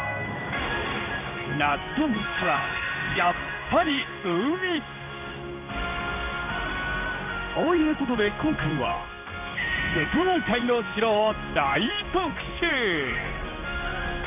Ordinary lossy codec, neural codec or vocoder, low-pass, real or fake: none; codec, 16 kHz in and 24 kHz out, 1 kbps, XY-Tokenizer; 3.6 kHz; fake